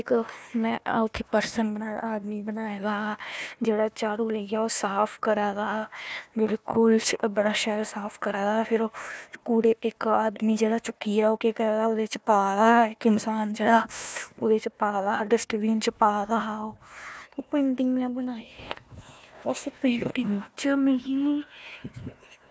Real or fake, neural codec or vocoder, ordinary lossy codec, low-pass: fake; codec, 16 kHz, 1 kbps, FunCodec, trained on Chinese and English, 50 frames a second; none; none